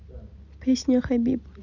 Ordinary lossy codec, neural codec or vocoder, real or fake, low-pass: none; none; real; 7.2 kHz